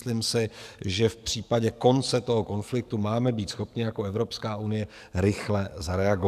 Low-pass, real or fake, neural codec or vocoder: 14.4 kHz; fake; codec, 44.1 kHz, 7.8 kbps, DAC